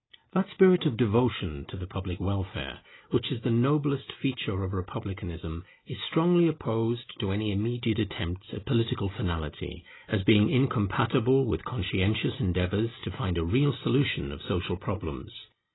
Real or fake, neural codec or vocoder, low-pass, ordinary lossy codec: real; none; 7.2 kHz; AAC, 16 kbps